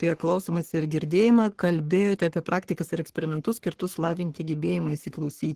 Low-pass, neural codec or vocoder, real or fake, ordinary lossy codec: 14.4 kHz; codec, 44.1 kHz, 3.4 kbps, Pupu-Codec; fake; Opus, 16 kbps